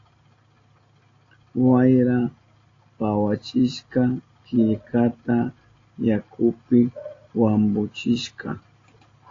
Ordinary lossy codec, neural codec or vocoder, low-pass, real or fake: AAC, 32 kbps; none; 7.2 kHz; real